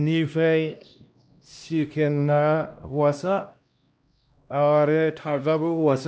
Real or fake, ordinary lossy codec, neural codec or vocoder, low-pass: fake; none; codec, 16 kHz, 1 kbps, X-Codec, HuBERT features, trained on LibriSpeech; none